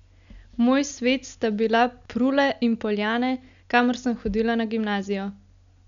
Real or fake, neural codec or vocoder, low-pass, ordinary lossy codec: real; none; 7.2 kHz; none